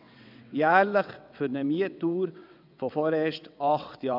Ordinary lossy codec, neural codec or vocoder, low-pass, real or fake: none; vocoder, 22.05 kHz, 80 mel bands, WaveNeXt; 5.4 kHz; fake